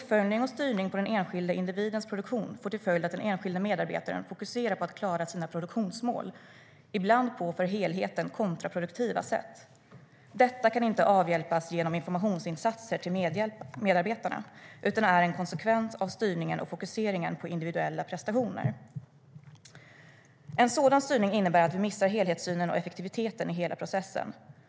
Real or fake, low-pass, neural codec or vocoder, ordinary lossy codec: real; none; none; none